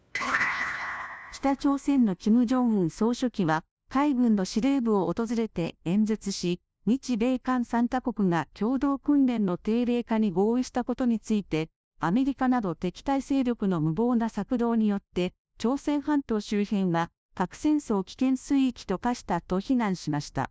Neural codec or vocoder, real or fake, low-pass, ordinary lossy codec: codec, 16 kHz, 1 kbps, FunCodec, trained on LibriTTS, 50 frames a second; fake; none; none